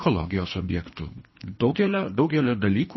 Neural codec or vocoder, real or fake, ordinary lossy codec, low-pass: codec, 24 kHz, 3 kbps, HILCodec; fake; MP3, 24 kbps; 7.2 kHz